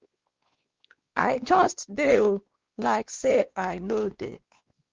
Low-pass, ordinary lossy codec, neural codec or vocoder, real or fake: 7.2 kHz; Opus, 32 kbps; codec, 16 kHz, 2 kbps, X-Codec, WavLM features, trained on Multilingual LibriSpeech; fake